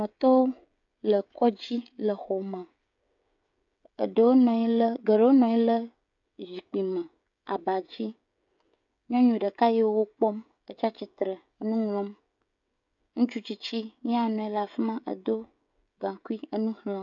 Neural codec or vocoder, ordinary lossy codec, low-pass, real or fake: codec, 16 kHz, 16 kbps, FreqCodec, smaller model; AAC, 64 kbps; 7.2 kHz; fake